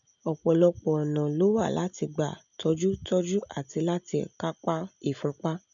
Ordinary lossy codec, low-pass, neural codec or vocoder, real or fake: none; 7.2 kHz; none; real